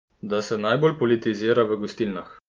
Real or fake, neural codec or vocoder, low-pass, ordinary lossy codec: real; none; 7.2 kHz; Opus, 32 kbps